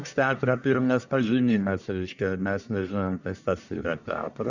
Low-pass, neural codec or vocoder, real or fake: 7.2 kHz; codec, 44.1 kHz, 1.7 kbps, Pupu-Codec; fake